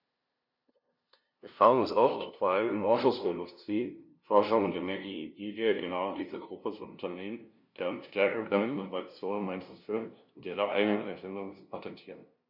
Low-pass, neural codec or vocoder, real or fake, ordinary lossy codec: 5.4 kHz; codec, 16 kHz, 0.5 kbps, FunCodec, trained on LibriTTS, 25 frames a second; fake; none